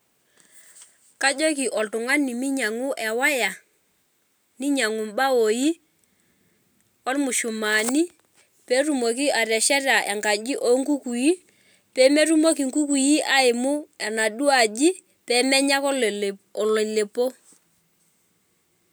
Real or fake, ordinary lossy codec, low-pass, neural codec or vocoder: real; none; none; none